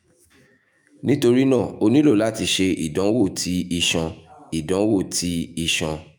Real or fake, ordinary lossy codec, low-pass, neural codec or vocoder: fake; none; none; autoencoder, 48 kHz, 128 numbers a frame, DAC-VAE, trained on Japanese speech